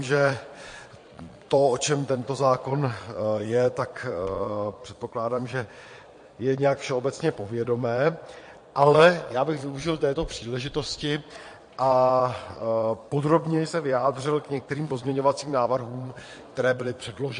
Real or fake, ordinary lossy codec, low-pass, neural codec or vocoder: fake; MP3, 48 kbps; 9.9 kHz; vocoder, 22.05 kHz, 80 mel bands, Vocos